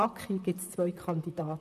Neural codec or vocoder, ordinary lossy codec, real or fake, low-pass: vocoder, 44.1 kHz, 128 mel bands, Pupu-Vocoder; none; fake; 14.4 kHz